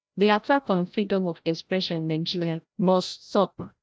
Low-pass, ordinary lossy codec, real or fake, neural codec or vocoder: none; none; fake; codec, 16 kHz, 0.5 kbps, FreqCodec, larger model